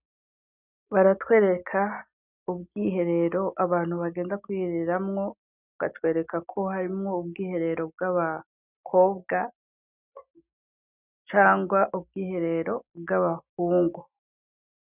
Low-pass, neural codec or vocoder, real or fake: 3.6 kHz; none; real